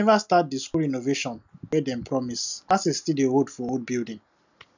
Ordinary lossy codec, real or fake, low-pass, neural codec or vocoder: none; real; 7.2 kHz; none